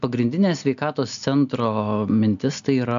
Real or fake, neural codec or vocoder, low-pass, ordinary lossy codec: real; none; 7.2 kHz; AAC, 96 kbps